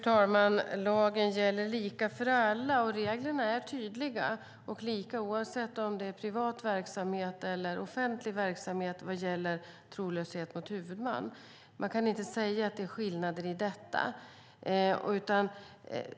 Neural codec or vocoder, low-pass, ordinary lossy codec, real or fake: none; none; none; real